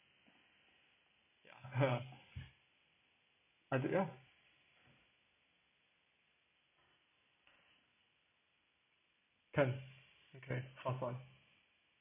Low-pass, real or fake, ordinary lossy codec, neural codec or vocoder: 3.6 kHz; real; none; none